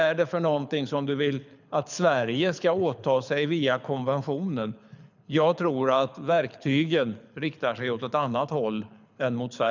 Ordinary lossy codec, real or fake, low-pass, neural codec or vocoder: none; fake; 7.2 kHz; codec, 24 kHz, 6 kbps, HILCodec